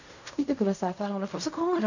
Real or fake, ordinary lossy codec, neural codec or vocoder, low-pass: fake; none; codec, 16 kHz in and 24 kHz out, 0.4 kbps, LongCat-Audio-Codec, fine tuned four codebook decoder; 7.2 kHz